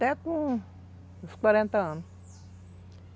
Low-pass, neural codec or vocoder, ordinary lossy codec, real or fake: none; none; none; real